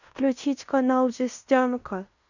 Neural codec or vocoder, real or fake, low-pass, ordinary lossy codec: codec, 16 kHz in and 24 kHz out, 0.9 kbps, LongCat-Audio-Codec, fine tuned four codebook decoder; fake; 7.2 kHz; none